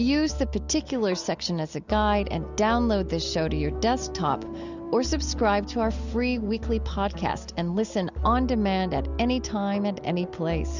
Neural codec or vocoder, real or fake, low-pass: none; real; 7.2 kHz